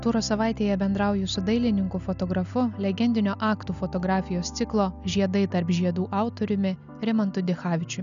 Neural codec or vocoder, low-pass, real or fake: none; 7.2 kHz; real